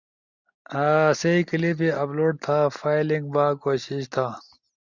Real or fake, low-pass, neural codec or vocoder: real; 7.2 kHz; none